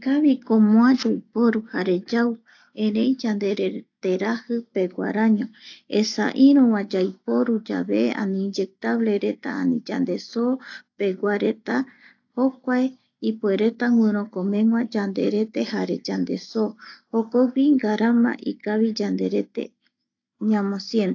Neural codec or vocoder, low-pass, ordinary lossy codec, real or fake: none; 7.2 kHz; AAC, 48 kbps; real